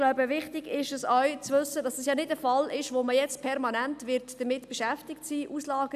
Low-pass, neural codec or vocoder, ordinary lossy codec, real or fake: 14.4 kHz; none; none; real